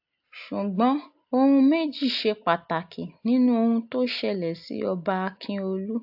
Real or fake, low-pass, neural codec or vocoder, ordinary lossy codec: real; 5.4 kHz; none; AAC, 48 kbps